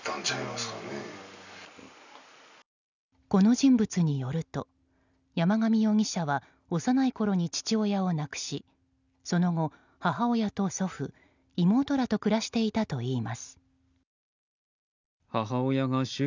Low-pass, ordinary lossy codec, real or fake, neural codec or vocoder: 7.2 kHz; none; real; none